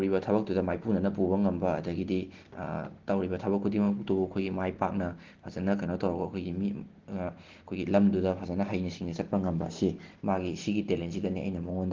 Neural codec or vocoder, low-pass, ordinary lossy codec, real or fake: none; 7.2 kHz; Opus, 16 kbps; real